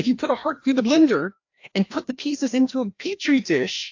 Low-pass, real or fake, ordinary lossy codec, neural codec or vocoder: 7.2 kHz; fake; AAC, 48 kbps; codec, 16 kHz, 1 kbps, FreqCodec, larger model